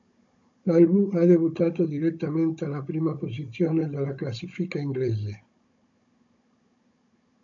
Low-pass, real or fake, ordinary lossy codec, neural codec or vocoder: 7.2 kHz; fake; MP3, 96 kbps; codec, 16 kHz, 16 kbps, FunCodec, trained on Chinese and English, 50 frames a second